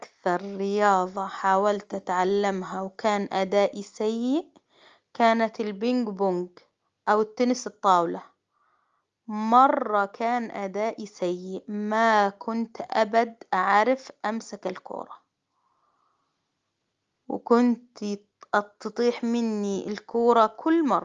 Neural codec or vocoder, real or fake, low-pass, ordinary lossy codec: none; real; 7.2 kHz; Opus, 32 kbps